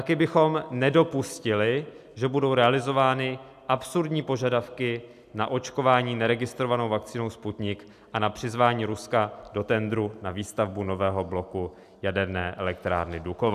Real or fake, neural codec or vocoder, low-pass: real; none; 14.4 kHz